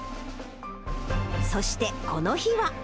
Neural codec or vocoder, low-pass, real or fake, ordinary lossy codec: none; none; real; none